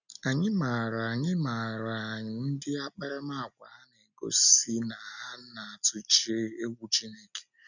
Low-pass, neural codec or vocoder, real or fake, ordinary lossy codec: 7.2 kHz; none; real; none